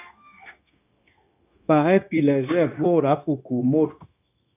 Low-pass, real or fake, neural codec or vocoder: 3.6 kHz; fake; codec, 16 kHz, 0.9 kbps, LongCat-Audio-Codec